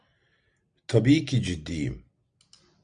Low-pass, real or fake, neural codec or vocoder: 9.9 kHz; real; none